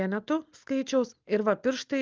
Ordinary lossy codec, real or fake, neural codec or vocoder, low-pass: Opus, 24 kbps; real; none; 7.2 kHz